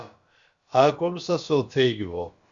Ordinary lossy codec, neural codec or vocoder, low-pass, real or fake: Opus, 64 kbps; codec, 16 kHz, about 1 kbps, DyCAST, with the encoder's durations; 7.2 kHz; fake